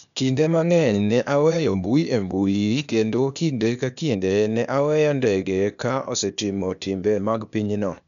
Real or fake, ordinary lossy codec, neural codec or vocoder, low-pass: fake; none; codec, 16 kHz, 0.8 kbps, ZipCodec; 7.2 kHz